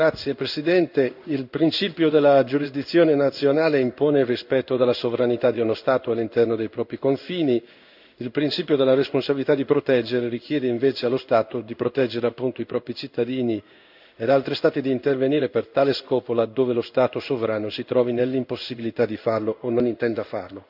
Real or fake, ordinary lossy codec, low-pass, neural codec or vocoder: fake; none; 5.4 kHz; codec, 16 kHz in and 24 kHz out, 1 kbps, XY-Tokenizer